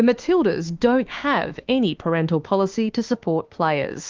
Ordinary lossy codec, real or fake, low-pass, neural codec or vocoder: Opus, 32 kbps; fake; 7.2 kHz; autoencoder, 48 kHz, 32 numbers a frame, DAC-VAE, trained on Japanese speech